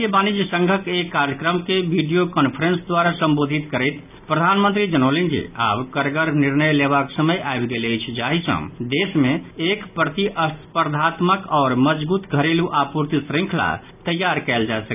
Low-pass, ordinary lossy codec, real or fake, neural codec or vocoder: 3.6 kHz; none; real; none